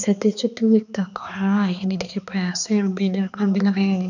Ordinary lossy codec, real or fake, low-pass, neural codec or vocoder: none; fake; 7.2 kHz; codec, 16 kHz, 2 kbps, X-Codec, HuBERT features, trained on balanced general audio